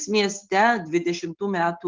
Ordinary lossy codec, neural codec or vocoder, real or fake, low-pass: Opus, 24 kbps; none; real; 7.2 kHz